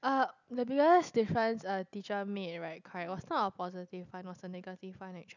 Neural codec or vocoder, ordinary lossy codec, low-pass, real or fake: none; none; 7.2 kHz; real